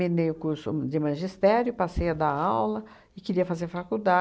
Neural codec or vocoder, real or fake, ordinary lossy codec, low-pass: none; real; none; none